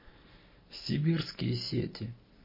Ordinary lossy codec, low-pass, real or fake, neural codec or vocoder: MP3, 24 kbps; 5.4 kHz; real; none